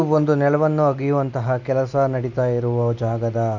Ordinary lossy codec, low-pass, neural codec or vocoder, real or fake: none; 7.2 kHz; none; real